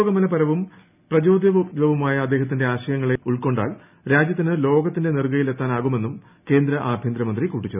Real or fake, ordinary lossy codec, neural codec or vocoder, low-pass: real; none; none; 3.6 kHz